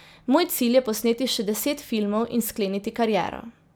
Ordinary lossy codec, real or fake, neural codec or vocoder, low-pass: none; real; none; none